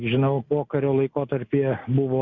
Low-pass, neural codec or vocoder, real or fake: 7.2 kHz; none; real